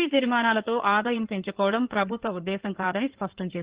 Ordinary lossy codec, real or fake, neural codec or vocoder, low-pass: Opus, 16 kbps; fake; codec, 16 kHz in and 24 kHz out, 2.2 kbps, FireRedTTS-2 codec; 3.6 kHz